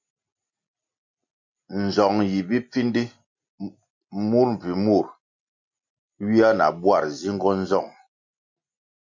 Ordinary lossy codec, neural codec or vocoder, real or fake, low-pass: MP3, 48 kbps; none; real; 7.2 kHz